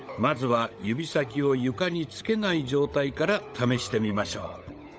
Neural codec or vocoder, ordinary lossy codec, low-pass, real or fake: codec, 16 kHz, 16 kbps, FunCodec, trained on LibriTTS, 50 frames a second; none; none; fake